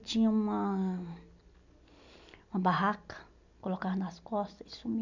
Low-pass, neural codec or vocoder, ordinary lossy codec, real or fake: 7.2 kHz; none; none; real